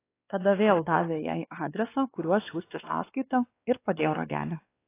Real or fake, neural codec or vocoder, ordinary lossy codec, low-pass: fake; codec, 16 kHz, 2 kbps, X-Codec, WavLM features, trained on Multilingual LibriSpeech; AAC, 24 kbps; 3.6 kHz